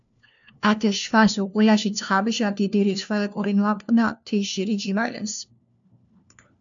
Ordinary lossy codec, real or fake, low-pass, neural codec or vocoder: MP3, 64 kbps; fake; 7.2 kHz; codec, 16 kHz, 1 kbps, FunCodec, trained on LibriTTS, 50 frames a second